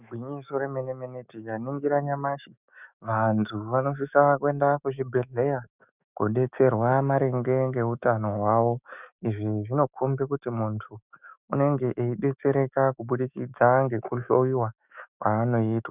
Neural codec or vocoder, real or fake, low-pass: autoencoder, 48 kHz, 128 numbers a frame, DAC-VAE, trained on Japanese speech; fake; 3.6 kHz